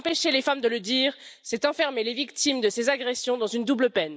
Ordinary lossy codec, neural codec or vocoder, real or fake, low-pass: none; none; real; none